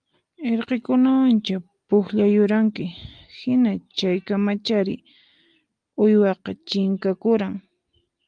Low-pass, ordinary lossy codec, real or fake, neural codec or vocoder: 9.9 kHz; Opus, 32 kbps; real; none